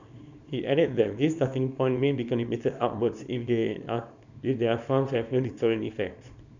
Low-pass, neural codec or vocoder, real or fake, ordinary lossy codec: 7.2 kHz; codec, 24 kHz, 0.9 kbps, WavTokenizer, small release; fake; none